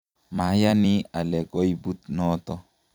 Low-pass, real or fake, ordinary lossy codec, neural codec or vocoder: 19.8 kHz; fake; none; vocoder, 44.1 kHz, 128 mel bands every 256 samples, BigVGAN v2